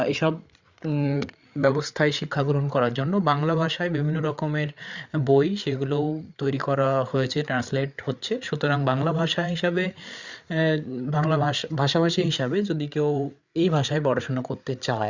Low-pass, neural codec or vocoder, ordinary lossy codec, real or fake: 7.2 kHz; codec, 16 kHz, 8 kbps, FreqCodec, larger model; Opus, 64 kbps; fake